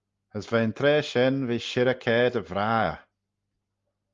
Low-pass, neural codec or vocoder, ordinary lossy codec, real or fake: 7.2 kHz; none; Opus, 32 kbps; real